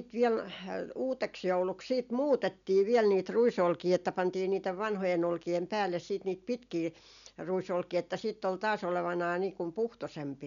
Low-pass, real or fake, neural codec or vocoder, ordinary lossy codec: 7.2 kHz; real; none; none